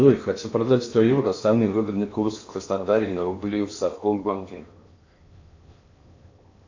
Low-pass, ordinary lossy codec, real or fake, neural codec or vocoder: 7.2 kHz; AAC, 48 kbps; fake; codec, 16 kHz in and 24 kHz out, 0.8 kbps, FocalCodec, streaming, 65536 codes